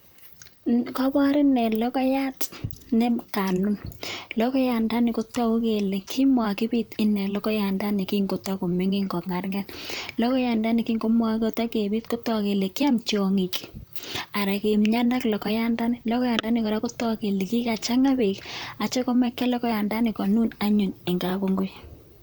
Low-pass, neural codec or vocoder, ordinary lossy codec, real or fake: none; vocoder, 44.1 kHz, 128 mel bands, Pupu-Vocoder; none; fake